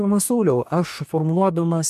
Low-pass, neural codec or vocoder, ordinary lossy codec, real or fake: 14.4 kHz; codec, 44.1 kHz, 2.6 kbps, DAC; MP3, 96 kbps; fake